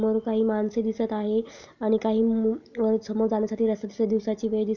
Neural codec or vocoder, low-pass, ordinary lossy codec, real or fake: none; 7.2 kHz; none; real